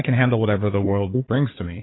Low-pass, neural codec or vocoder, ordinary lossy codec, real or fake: 7.2 kHz; codec, 16 kHz, 4 kbps, FunCodec, trained on Chinese and English, 50 frames a second; AAC, 16 kbps; fake